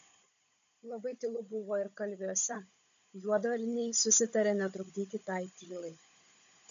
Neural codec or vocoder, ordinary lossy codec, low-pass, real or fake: codec, 16 kHz, 16 kbps, FunCodec, trained on Chinese and English, 50 frames a second; MP3, 64 kbps; 7.2 kHz; fake